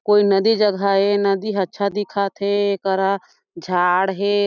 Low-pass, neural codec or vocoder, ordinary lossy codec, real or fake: 7.2 kHz; none; none; real